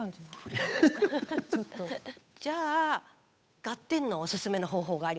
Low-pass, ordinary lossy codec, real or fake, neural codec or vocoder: none; none; fake; codec, 16 kHz, 8 kbps, FunCodec, trained on Chinese and English, 25 frames a second